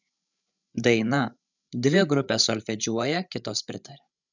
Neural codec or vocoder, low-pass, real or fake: codec, 16 kHz, 8 kbps, FreqCodec, larger model; 7.2 kHz; fake